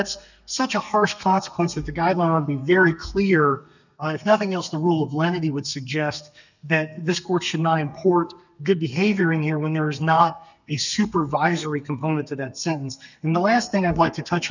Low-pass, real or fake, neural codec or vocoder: 7.2 kHz; fake; codec, 32 kHz, 1.9 kbps, SNAC